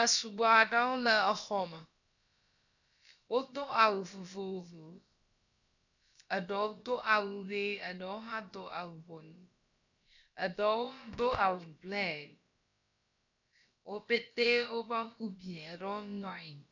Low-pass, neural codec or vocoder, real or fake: 7.2 kHz; codec, 16 kHz, about 1 kbps, DyCAST, with the encoder's durations; fake